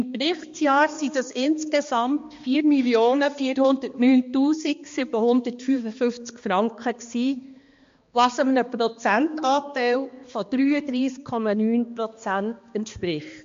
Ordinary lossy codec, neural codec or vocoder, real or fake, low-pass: MP3, 48 kbps; codec, 16 kHz, 2 kbps, X-Codec, HuBERT features, trained on balanced general audio; fake; 7.2 kHz